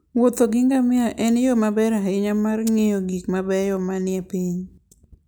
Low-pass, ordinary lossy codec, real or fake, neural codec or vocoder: none; none; real; none